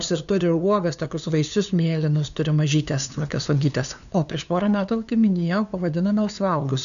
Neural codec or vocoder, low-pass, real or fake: codec, 16 kHz, 2 kbps, FunCodec, trained on LibriTTS, 25 frames a second; 7.2 kHz; fake